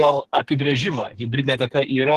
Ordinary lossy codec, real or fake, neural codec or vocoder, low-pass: Opus, 16 kbps; fake; codec, 32 kHz, 1.9 kbps, SNAC; 14.4 kHz